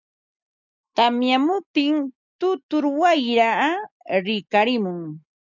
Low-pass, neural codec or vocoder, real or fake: 7.2 kHz; none; real